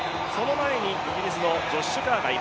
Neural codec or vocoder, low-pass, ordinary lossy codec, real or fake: none; none; none; real